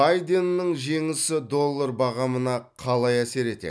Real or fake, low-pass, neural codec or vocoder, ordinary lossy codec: real; none; none; none